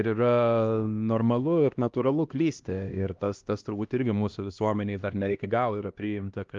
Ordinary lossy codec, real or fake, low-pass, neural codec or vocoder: Opus, 32 kbps; fake; 7.2 kHz; codec, 16 kHz, 1 kbps, X-Codec, HuBERT features, trained on LibriSpeech